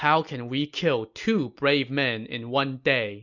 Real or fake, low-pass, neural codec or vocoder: real; 7.2 kHz; none